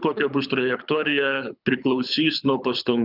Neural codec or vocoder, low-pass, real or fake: codec, 24 kHz, 6 kbps, HILCodec; 5.4 kHz; fake